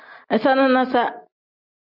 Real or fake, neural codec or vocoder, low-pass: real; none; 5.4 kHz